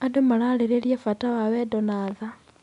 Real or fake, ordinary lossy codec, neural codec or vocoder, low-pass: real; none; none; 10.8 kHz